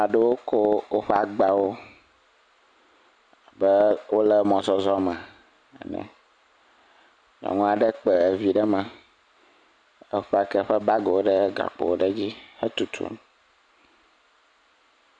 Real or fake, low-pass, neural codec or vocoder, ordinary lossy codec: real; 9.9 kHz; none; MP3, 96 kbps